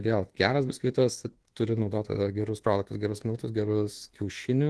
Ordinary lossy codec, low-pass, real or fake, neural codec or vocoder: Opus, 16 kbps; 10.8 kHz; fake; codec, 24 kHz, 1.2 kbps, DualCodec